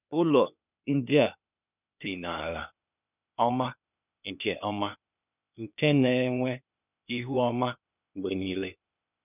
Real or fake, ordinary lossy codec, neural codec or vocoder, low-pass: fake; none; codec, 16 kHz, 0.8 kbps, ZipCodec; 3.6 kHz